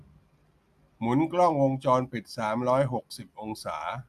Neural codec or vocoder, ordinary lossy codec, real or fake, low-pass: none; MP3, 96 kbps; real; 14.4 kHz